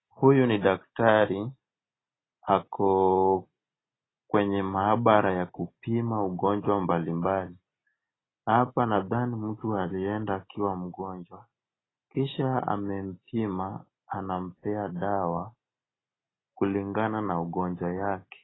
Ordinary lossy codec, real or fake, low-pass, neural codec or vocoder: AAC, 16 kbps; real; 7.2 kHz; none